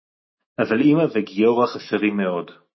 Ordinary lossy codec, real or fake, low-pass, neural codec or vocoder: MP3, 24 kbps; fake; 7.2 kHz; autoencoder, 48 kHz, 128 numbers a frame, DAC-VAE, trained on Japanese speech